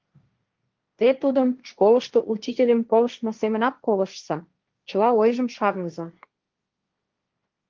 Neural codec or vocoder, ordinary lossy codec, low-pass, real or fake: codec, 16 kHz, 1.1 kbps, Voila-Tokenizer; Opus, 32 kbps; 7.2 kHz; fake